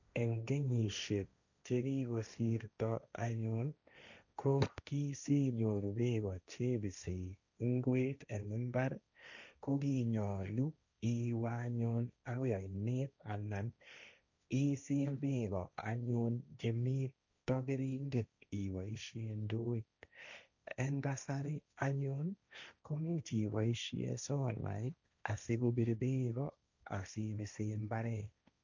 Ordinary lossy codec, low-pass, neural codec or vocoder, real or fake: none; 7.2 kHz; codec, 16 kHz, 1.1 kbps, Voila-Tokenizer; fake